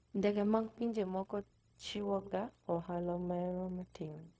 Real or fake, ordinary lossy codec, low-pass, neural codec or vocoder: fake; none; none; codec, 16 kHz, 0.4 kbps, LongCat-Audio-Codec